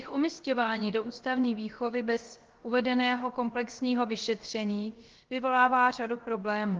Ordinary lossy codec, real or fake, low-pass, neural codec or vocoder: Opus, 16 kbps; fake; 7.2 kHz; codec, 16 kHz, about 1 kbps, DyCAST, with the encoder's durations